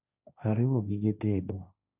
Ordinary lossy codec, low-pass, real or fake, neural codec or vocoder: none; 3.6 kHz; fake; codec, 16 kHz, 1.1 kbps, Voila-Tokenizer